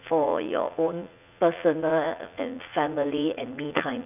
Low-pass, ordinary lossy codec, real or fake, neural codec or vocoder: 3.6 kHz; none; fake; vocoder, 44.1 kHz, 80 mel bands, Vocos